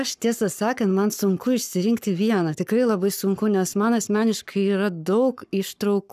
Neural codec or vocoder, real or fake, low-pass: codec, 44.1 kHz, 7.8 kbps, Pupu-Codec; fake; 14.4 kHz